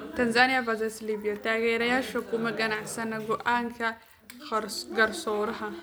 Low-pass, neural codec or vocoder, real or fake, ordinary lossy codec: none; none; real; none